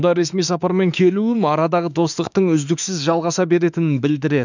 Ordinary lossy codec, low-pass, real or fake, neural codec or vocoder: none; 7.2 kHz; fake; autoencoder, 48 kHz, 32 numbers a frame, DAC-VAE, trained on Japanese speech